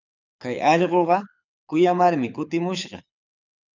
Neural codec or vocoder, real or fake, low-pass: codec, 24 kHz, 6 kbps, HILCodec; fake; 7.2 kHz